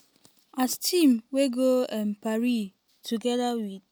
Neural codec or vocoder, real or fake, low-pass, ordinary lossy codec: none; real; none; none